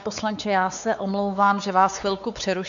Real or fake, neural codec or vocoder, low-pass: fake; codec, 16 kHz, 4 kbps, X-Codec, WavLM features, trained on Multilingual LibriSpeech; 7.2 kHz